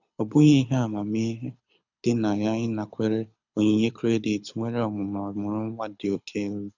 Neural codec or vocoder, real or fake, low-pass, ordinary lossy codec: codec, 24 kHz, 6 kbps, HILCodec; fake; 7.2 kHz; none